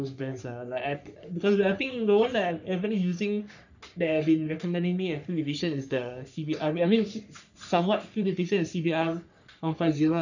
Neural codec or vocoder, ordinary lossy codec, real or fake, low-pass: codec, 44.1 kHz, 3.4 kbps, Pupu-Codec; none; fake; 7.2 kHz